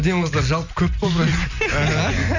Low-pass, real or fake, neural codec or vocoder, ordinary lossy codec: 7.2 kHz; real; none; none